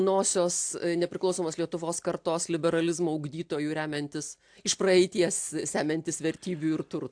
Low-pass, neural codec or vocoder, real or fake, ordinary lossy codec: 9.9 kHz; none; real; AAC, 64 kbps